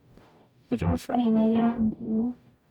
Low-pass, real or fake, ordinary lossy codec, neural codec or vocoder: 19.8 kHz; fake; none; codec, 44.1 kHz, 0.9 kbps, DAC